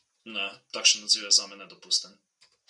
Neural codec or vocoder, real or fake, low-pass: none; real; 10.8 kHz